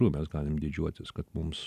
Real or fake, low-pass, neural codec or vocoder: real; 14.4 kHz; none